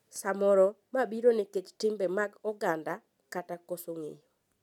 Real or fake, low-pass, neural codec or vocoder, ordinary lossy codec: real; 19.8 kHz; none; none